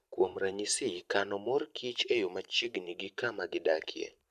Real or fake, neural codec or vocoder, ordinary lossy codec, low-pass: fake; vocoder, 44.1 kHz, 128 mel bands every 512 samples, BigVGAN v2; MP3, 96 kbps; 14.4 kHz